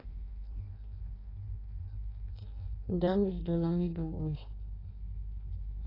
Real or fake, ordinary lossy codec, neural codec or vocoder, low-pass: fake; AAC, 24 kbps; codec, 16 kHz in and 24 kHz out, 0.6 kbps, FireRedTTS-2 codec; 5.4 kHz